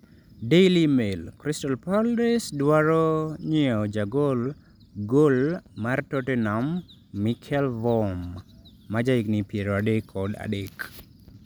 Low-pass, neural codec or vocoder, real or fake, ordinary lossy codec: none; none; real; none